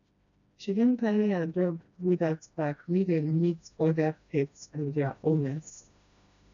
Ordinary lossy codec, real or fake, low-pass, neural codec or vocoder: none; fake; 7.2 kHz; codec, 16 kHz, 1 kbps, FreqCodec, smaller model